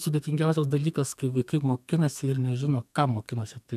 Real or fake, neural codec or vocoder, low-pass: fake; codec, 32 kHz, 1.9 kbps, SNAC; 14.4 kHz